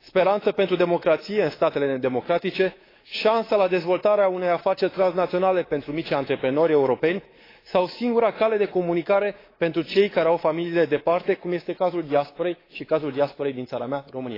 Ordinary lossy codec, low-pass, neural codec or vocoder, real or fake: AAC, 24 kbps; 5.4 kHz; codec, 24 kHz, 3.1 kbps, DualCodec; fake